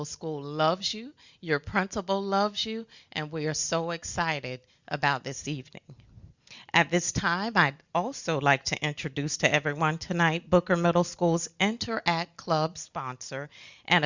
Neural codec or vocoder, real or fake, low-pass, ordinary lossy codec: none; real; 7.2 kHz; Opus, 64 kbps